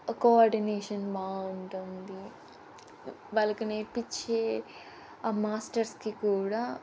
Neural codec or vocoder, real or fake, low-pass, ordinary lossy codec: none; real; none; none